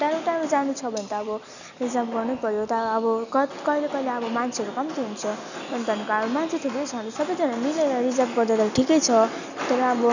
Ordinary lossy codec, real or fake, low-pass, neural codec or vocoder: none; real; 7.2 kHz; none